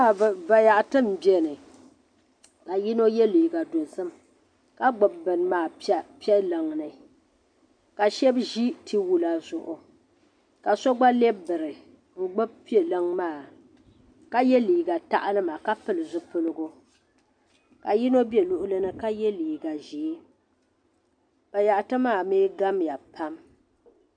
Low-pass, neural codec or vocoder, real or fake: 9.9 kHz; none; real